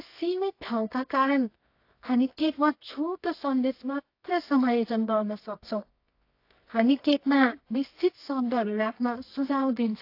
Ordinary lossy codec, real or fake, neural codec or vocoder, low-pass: AAC, 32 kbps; fake; codec, 24 kHz, 0.9 kbps, WavTokenizer, medium music audio release; 5.4 kHz